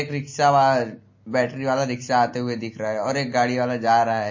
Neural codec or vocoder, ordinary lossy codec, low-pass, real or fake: none; MP3, 32 kbps; 7.2 kHz; real